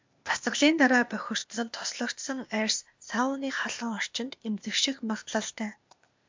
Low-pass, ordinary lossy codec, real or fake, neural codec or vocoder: 7.2 kHz; MP3, 64 kbps; fake; codec, 16 kHz, 0.8 kbps, ZipCodec